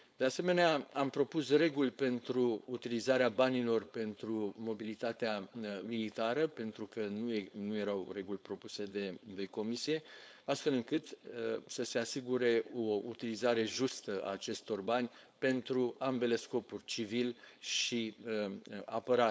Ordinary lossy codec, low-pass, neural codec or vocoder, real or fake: none; none; codec, 16 kHz, 4.8 kbps, FACodec; fake